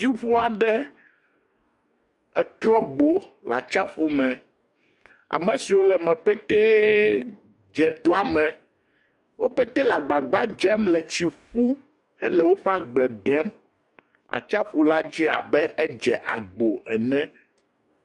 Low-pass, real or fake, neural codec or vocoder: 10.8 kHz; fake; codec, 44.1 kHz, 2.6 kbps, DAC